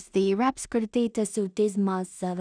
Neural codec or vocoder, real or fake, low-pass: codec, 16 kHz in and 24 kHz out, 0.4 kbps, LongCat-Audio-Codec, two codebook decoder; fake; 9.9 kHz